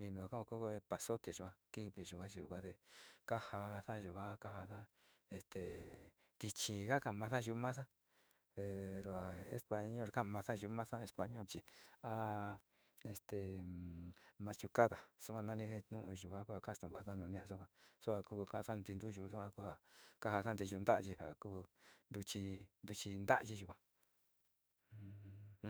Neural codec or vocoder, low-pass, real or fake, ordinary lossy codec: autoencoder, 48 kHz, 32 numbers a frame, DAC-VAE, trained on Japanese speech; none; fake; none